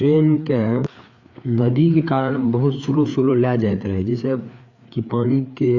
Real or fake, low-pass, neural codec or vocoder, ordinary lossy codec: fake; 7.2 kHz; codec, 16 kHz, 4 kbps, FreqCodec, larger model; Opus, 64 kbps